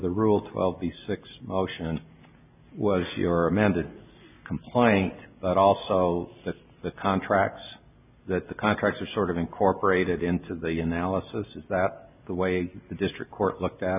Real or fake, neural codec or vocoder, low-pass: real; none; 3.6 kHz